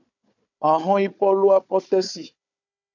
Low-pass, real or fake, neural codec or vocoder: 7.2 kHz; fake; codec, 16 kHz, 4 kbps, FunCodec, trained on Chinese and English, 50 frames a second